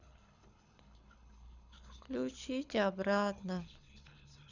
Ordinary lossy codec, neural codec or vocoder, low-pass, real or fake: none; codec, 24 kHz, 6 kbps, HILCodec; 7.2 kHz; fake